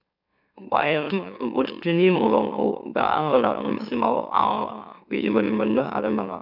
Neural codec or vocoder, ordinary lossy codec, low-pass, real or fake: autoencoder, 44.1 kHz, a latent of 192 numbers a frame, MeloTTS; none; 5.4 kHz; fake